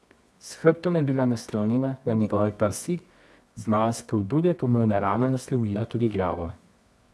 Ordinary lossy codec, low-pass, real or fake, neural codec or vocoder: none; none; fake; codec, 24 kHz, 0.9 kbps, WavTokenizer, medium music audio release